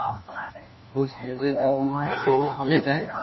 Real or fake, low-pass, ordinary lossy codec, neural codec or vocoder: fake; 7.2 kHz; MP3, 24 kbps; codec, 16 kHz, 1 kbps, FunCodec, trained on LibriTTS, 50 frames a second